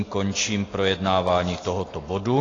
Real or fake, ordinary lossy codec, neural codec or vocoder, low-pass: real; AAC, 32 kbps; none; 7.2 kHz